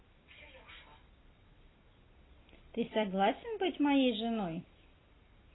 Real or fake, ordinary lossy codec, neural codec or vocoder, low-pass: real; AAC, 16 kbps; none; 7.2 kHz